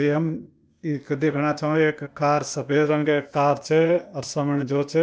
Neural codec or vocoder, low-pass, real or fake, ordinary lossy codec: codec, 16 kHz, 0.8 kbps, ZipCodec; none; fake; none